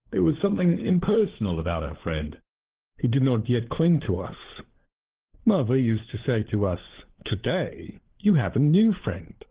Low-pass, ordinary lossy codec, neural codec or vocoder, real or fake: 3.6 kHz; Opus, 16 kbps; codec, 16 kHz, 4 kbps, FunCodec, trained on LibriTTS, 50 frames a second; fake